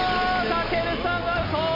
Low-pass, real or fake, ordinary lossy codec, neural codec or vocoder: 5.4 kHz; real; none; none